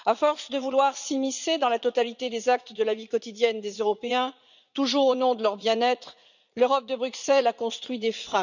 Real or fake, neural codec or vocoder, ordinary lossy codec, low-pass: fake; vocoder, 44.1 kHz, 80 mel bands, Vocos; none; 7.2 kHz